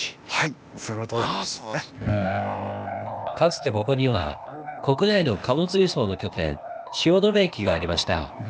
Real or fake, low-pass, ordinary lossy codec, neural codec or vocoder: fake; none; none; codec, 16 kHz, 0.8 kbps, ZipCodec